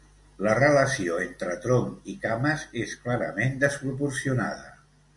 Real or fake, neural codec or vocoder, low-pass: real; none; 10.8 kHz